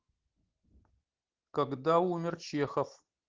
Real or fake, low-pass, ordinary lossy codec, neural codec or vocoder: real; 7.2 kHz; Opus, 16 kbps; none